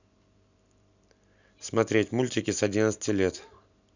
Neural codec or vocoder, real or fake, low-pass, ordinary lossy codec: none; real; 7.2 kHz; none